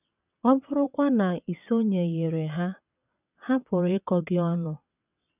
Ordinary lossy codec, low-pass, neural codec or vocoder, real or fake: none; 3.6 kHz; vocoder, 22.05 kHz, 80 mel bands, WaveNeXt; fake